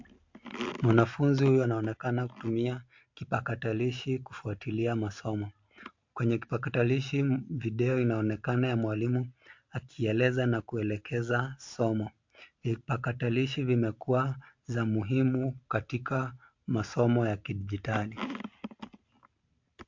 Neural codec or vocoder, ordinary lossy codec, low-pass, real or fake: none; MP3, 48 kbps; 7.2 kHz; real